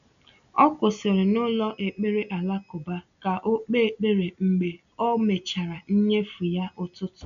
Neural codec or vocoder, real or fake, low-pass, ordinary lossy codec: none; real; 7.2 kHz; none